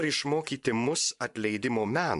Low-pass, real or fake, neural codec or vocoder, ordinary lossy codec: 10.8 kHz; fake; vocoder, 24 kHz, 100 mel bands, Vocos; AAC, 96 kbps